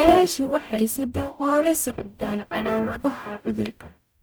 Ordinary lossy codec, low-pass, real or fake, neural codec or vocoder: none; none; fake; codec, 44.1 kHz, 0.9 kbps, DAC